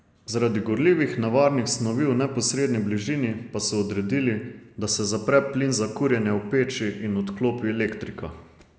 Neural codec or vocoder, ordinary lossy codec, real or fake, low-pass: none; none; real; none